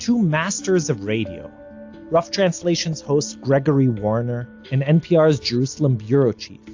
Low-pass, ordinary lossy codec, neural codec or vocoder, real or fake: 7.2 kHz; AAC, 48 kbps; none; real